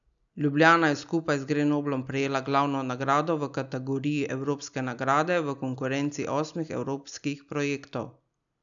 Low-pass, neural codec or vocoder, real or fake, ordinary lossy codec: 7.2 kHz; none; real; none